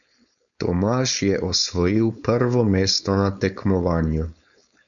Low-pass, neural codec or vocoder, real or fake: 7.2 kHz; codec, 16 kHz, 4.8 kbps, FACodec; fake